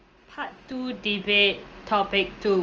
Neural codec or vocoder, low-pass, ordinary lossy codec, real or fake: none; 7.2 kHz; Opus, 24 kbps; real